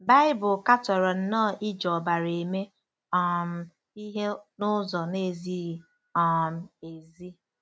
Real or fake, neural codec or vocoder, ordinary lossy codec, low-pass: real; none; none; none